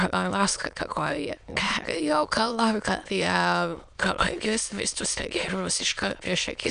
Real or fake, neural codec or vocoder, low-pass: fake; autoencoder, 22.05 kHz, a latent of 192 numbers a frame, VITS, trained on many speakers; 9.9 kHz